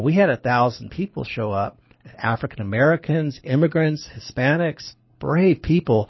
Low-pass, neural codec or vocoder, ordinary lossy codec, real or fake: 7.2 kHz; codec, 24 kHz, 6 kbps, HILCodec; MP3, 24 kbps; fake